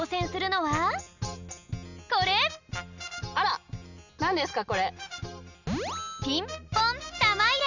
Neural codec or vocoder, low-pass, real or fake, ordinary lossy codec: none; 7.2 kHz; real; none